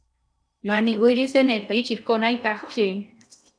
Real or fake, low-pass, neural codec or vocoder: fake; 9.9 kHz; codec, 16 kHz in and 24 kHz out, 0.6 kbps, FocalCodec, streaming, 4096 codes